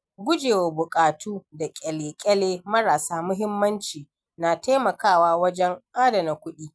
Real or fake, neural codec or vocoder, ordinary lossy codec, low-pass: real; none; none; none